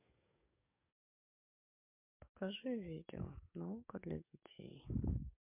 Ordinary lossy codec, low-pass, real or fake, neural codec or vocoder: MP3, 32 kbps; 3.6 kHz; fake; codec, 44.1 kHz, 7.8 kbps, DAC